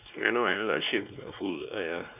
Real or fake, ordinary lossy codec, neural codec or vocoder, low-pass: fake; none; codec, 16 kHz, 2 kbps, X-Codec, WavLM features, trained on Multilingual LibriSpeech; 3.6 kHz